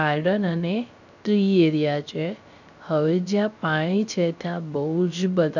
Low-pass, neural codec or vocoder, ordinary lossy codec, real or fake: 7.2 kHz; codec, 16 kHz, 0.3 kbps, FocalCodec; none; fake